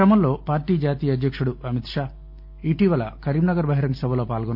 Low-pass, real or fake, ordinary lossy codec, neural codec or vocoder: 5.4 kHz; real; none; none